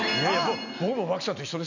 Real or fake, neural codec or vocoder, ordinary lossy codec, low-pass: real; none; none; 7.2 kHz